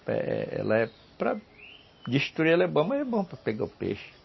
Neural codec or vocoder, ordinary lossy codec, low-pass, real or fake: none; MP3, 24 kbps; 7.2 kHz; real